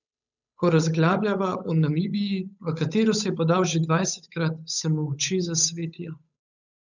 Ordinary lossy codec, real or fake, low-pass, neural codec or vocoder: none; fake; 7.2 kHz; codec, 16 kHz, 8 kbps, FunCodec, trained on Chinese and English, 25 frames a second